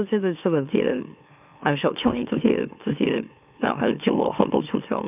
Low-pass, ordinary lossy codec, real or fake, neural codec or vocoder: 3.6 kHz; none; fake; autoencoder, 44.1 kHz, a latent of 192 numbers a frame, MeloTTS